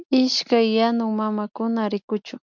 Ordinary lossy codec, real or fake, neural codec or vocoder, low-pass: MP3, 64 kbps; real; none; 7.2 kHz